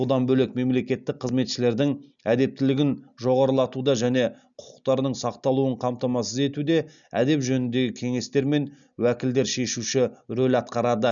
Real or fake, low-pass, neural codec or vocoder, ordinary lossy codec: real; 7.2 kHz; none; none